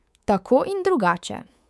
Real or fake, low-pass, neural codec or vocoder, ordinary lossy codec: fake; none; codec, 24 kHz, 3.1 kbps, DualCodec; none